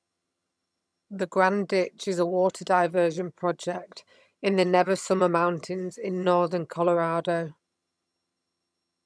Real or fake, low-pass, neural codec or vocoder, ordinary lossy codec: fake; none; vocoder, 22.05 kHz, 80 mel bands, HiFi-GAN; none